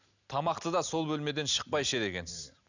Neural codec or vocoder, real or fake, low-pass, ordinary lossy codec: none; real; 7.2 kHz; none